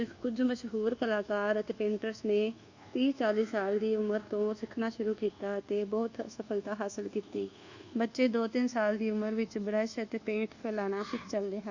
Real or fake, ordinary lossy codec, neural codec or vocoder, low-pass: fake; Opus, 64 kbps; codec, 24 kHz, 1.2 kbps, DualCodec; 7.2 kHz